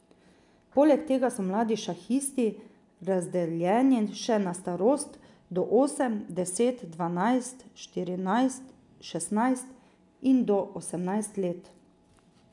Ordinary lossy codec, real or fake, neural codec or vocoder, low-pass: none; real; none; 10.8 kHz